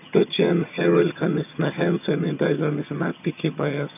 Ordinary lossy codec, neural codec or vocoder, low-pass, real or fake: none; vocoder, 22.05 kHz, 80 mel bands, HiFi-GAN; 3.6 kHz; fake